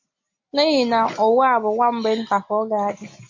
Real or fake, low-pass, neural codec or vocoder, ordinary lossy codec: real; 7.2 kHz; none; MP3, 64 kbps